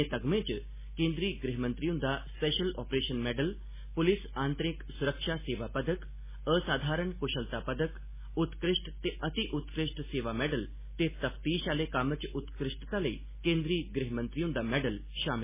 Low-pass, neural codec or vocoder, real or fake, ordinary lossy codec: 3.6 kHz; none; real; MP3, 16 kbps